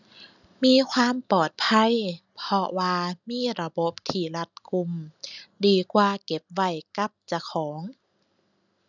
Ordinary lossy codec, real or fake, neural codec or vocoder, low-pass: none; real; none; 7.2 kHz